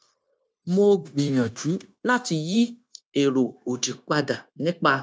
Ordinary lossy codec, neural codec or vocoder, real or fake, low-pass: none; codec, 16 kHz, 0.9 kbps, LongCat-Audio-Codec; fake; none